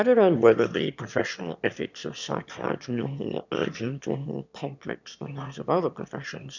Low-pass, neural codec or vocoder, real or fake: 7.2 kHz; autoencoder, 22.05 kHz, a latent of 192 numbers a frame, VITS, trained on one speaker; fake